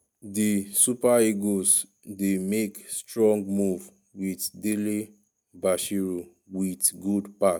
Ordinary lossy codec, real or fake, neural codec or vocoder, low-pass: none; real; none; none